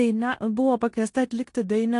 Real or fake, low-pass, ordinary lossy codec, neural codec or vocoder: fake; 10.8 kHz; AAC, 48 kbps; codec, 16 kHz in and 24 kHz out, 0.9 kbps, LongCat-Audio-Codec, four codebook decoder